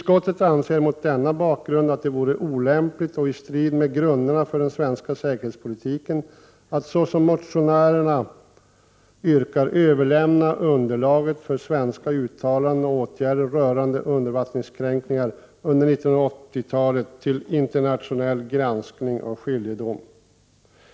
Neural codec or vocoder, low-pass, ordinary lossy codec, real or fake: none; none; none; real